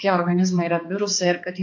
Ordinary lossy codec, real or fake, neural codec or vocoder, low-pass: MP3, 48 kbps; fake; codec, 16 kHz, 2 kbps, X-Codec, HuBERT features, trained on balanced general audio; 7.2 kHz